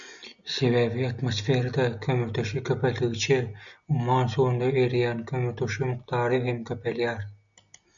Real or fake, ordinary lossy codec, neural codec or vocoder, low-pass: real; MP3, 96 kbps; none; 7.2 kHz